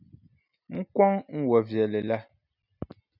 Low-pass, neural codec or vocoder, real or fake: 5.4 kHz; none; real